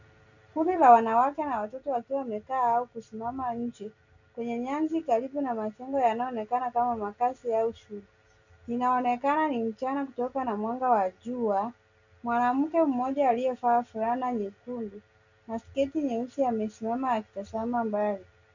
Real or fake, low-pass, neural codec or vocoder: real; 7.2 kHz; none